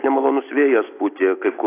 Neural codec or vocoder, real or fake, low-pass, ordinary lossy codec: none; real; 3.6 kHz; AAC, 24 kbps